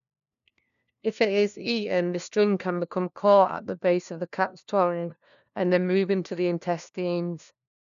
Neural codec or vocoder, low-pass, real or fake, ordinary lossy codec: codec, 16 kHz, 1 kbps, FunCodec, trained on LibriTTS, 50 frames a second; 7.2 kHz; fake; none